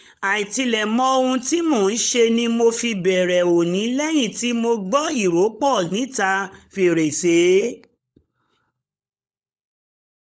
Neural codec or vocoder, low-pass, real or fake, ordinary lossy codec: codec, 16 kHz, 16 kbps, FunCodec, trained on LibriTTS, 50 frames a second; none; fake; none